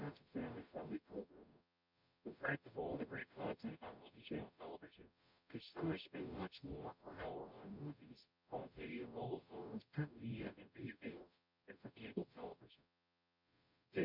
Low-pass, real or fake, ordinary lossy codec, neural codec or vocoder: 5.4 kHz; fake; MP3, 48 kbps; codec, 44.1 kHz, 0.9 kbps, DAC